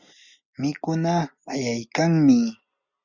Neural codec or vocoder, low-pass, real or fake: none; 7.2 kHz; real